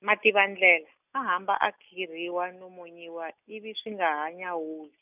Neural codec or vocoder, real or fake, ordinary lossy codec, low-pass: none; real; none; 3.6 kHz